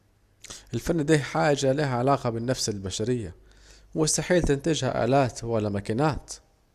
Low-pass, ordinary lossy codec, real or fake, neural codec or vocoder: 14.4 kHz; Opus, 64 kbps; real; none